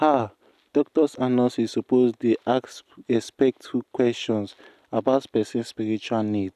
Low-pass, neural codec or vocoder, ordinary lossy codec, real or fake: 14.4 kHz; none; none; real